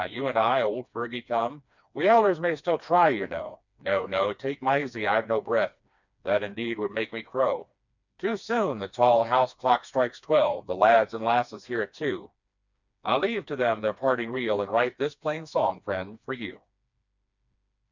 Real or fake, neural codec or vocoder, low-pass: fake; codec, 16 kHz, 2 kbps, FreqCodec, smaller model; 7.2 kHz